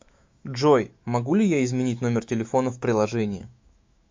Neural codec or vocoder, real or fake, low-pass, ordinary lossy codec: autoencoder, 48 kHz, 128 numbers a frame, DAC-VAE, trained on Japanese speech; fake; 7.2 kHz; MP3, 64 kbps